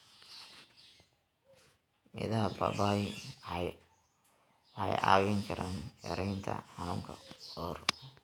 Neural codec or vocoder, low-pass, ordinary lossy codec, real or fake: vocoder, 48 kHz, 128 mel bands, Vocos; 19.8 kHz; none; fake